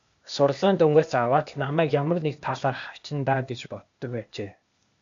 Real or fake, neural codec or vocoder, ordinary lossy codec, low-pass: fake; codec, 16 kHz, 0.8 kbps, ZipCodec; MP3, 64 kbps; 7.2 kHz